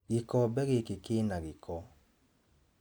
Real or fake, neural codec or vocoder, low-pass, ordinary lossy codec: real; none; none; none